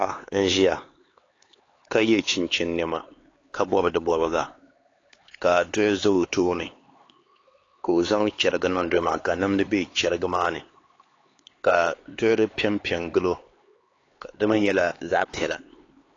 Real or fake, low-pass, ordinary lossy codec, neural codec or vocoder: fake; 7.2 kHz; AAC, 32 kbps; codec, 16 kHz, 4 kbps, X-Codec, HuBERT features, trained on LibriSpeech